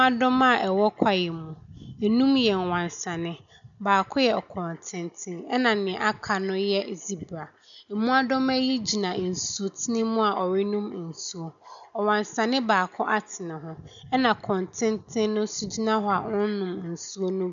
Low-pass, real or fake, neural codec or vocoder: 7.2 kHz; real; none